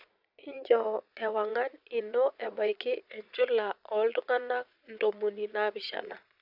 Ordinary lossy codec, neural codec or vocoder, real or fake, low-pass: AAC, 48 kbps; vocoder, 22.05 kHz, 80 mel bands, Vocos; fake; 5.4 kHz